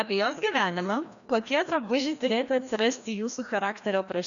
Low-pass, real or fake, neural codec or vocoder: 7.2 kHz; fake; codec, 16 kHz, 1 kbps, FreqCodec, larger model